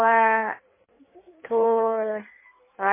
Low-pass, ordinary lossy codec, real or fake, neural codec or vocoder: 3.6 kHz; MP3, 16 kbps; fake; codec, 16 kHz in and 24 kHz out, 1.1 kbps, FireRedTTS-2 codec